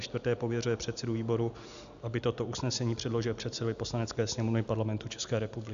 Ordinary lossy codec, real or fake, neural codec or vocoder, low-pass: AAC, 96 kbps; real; none; 7.2 kHz